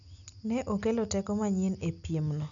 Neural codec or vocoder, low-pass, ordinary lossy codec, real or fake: none; 7.2 kHz; none; real